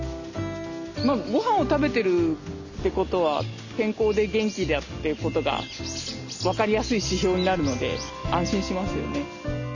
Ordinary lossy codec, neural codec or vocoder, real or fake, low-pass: none; none; real; 7.2 kHz